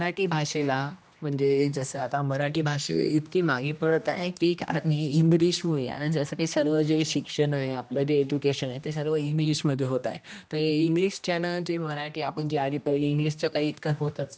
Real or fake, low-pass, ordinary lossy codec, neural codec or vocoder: fake; none; none; codec, 16 kHz, 1 kbps, X-Codec, HuBERT features, trained on general audio